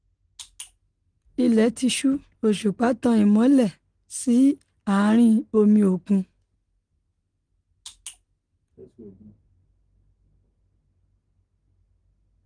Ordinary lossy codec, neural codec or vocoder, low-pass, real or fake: Opus, 24 kbps; none; 9.9 kHz; real